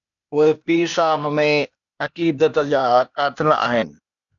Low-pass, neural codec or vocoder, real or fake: 7.2 kHz; codec, 16 kHz, 0.8 kbps, ZipCodec; fake